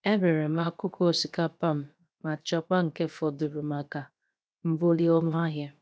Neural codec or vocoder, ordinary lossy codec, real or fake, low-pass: codec, 16 kHz, 0.7 kbps, FocalCodec; none; fake; none